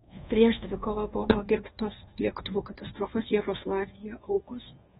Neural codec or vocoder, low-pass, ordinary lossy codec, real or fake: codec, 24 kHz, 1.2 kbps, DualCodec; 10.8 kHz; AAC, 16 kbps; fake